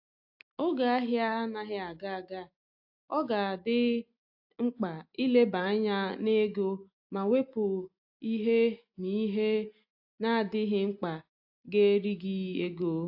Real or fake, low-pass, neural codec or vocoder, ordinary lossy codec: real; 5.4 kHz; none; AAC, 48 kbps